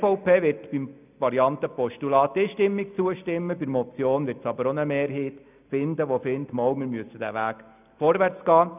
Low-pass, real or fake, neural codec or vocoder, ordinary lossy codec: 3.6 kHz; real; none; none